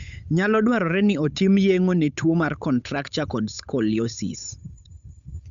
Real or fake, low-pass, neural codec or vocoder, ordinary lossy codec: fake; 7.2 kHz; codec, 16 kHz, 8 kbps, FunCodec, trained on Chinese and English, 25 frames a second; none